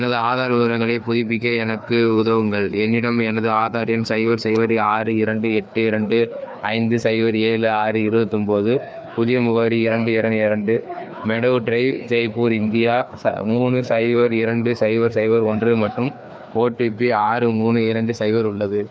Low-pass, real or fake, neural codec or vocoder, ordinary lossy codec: none; fake; codec, 16 kHz, 2 kbps, FreqCodec, larger model; none